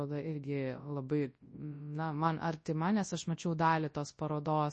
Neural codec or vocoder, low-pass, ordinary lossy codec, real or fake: codec, 24 kHz, 0.9 kbps, WavTokenizer, large speech release; 10.8 kHz; MP3, 32 kbps; fake